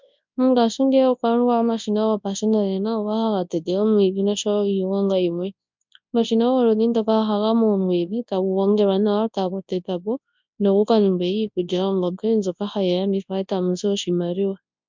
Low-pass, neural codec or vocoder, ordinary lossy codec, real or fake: 7.2 kHz; codec, 24 kHz, 0.9 kbps, WavTokenizer, large speech release; MP3, 64 kbps; fake